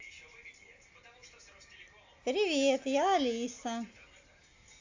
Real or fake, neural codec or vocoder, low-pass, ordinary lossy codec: real; none; 7.2 kHz; AAC, 48 kbps